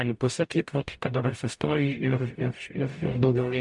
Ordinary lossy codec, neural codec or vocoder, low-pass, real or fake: MP3, 64 kbps; codec, 44.1 kHz, 0.9 kbps, DAC; 10.8 kHz; fake